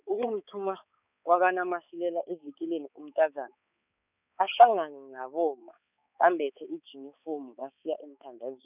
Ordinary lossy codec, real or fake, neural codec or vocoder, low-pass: none; fake; codec, 16 kHz, 4 kbps, X-Codec, HuBERT features, trained on balanced general audio; 3.6 kHz